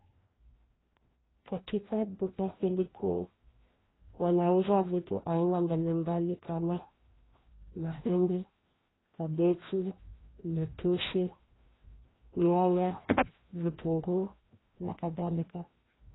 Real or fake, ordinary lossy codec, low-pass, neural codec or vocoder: fake; AAC, 16 kbps; 7.2 kHz; codec, 16 kHz, 1 kbps, FreqCodec, larger model